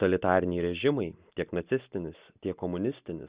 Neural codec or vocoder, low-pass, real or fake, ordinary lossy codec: none; 3.6 kHz; real; Opus, 64 kbps